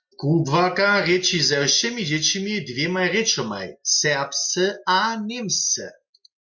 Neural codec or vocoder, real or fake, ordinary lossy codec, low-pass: none; real; MP3, 48 kbps; 7.2 kHz